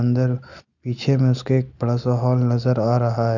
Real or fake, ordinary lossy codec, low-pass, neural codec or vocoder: real; none; 7.2 kHz; none